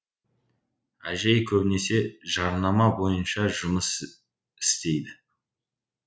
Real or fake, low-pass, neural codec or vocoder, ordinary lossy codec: real; none; none; none